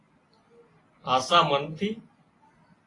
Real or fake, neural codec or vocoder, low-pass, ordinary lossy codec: real; none; 10.8 kHz; AAC, 32 kbps